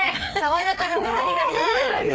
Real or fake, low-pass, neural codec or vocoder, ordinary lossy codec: fake; none; codec, 16 kHz, 4 kbps, FreqCodec, larger model; none